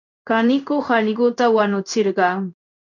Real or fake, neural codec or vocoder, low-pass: fake; codec, 16 kHz in and 24 kHz out, 1 kbps, XY-Tokenizer; 7.2 kHz